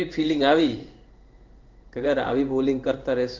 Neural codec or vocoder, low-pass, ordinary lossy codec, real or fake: codec, 16 kHz in and 24 kHz out, 1 kbps, XY-Tokenizer; 7.2 kHz; Opus, 32 kbps; fake